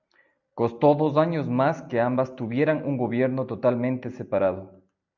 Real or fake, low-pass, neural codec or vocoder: real; 7.2 kHz; none